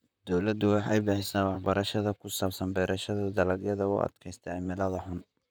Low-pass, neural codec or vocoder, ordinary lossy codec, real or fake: none; vocoder, 44.1 kHz, 128 mel bands, Pupu-Vocoder; none; fake